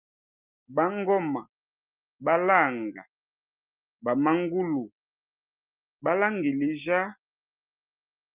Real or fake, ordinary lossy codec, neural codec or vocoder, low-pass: real; Opus, 24 kbps; none; 3.6 kHz